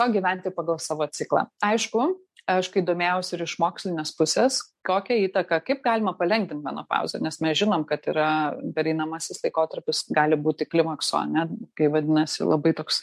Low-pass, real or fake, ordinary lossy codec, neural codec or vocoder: 14.4 kHz; real; MP3, 64 kbps; none